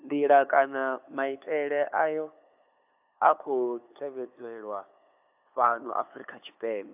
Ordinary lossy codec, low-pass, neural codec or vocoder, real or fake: AAC, 32 kbps; 3.6 kHz; codec, 16 kHz, 8 kbps, FunCodec, trained on LibriTTS, 25 frames a second; fake